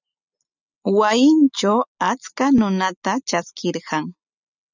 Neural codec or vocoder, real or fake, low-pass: none; real; 7.2 kHz